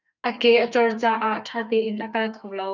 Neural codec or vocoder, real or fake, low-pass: codec, 32 kHz, 1.9 kbps, SNAC; fake; 7.2 kHz